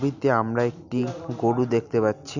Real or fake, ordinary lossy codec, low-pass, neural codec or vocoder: real; none; 7.2 kHz; none